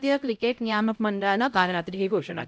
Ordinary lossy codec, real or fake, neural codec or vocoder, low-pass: none; fake; codec, 16 kHz, 0.5 kbps, X-Codec, HuBERT features, trained on LibriSpeech; none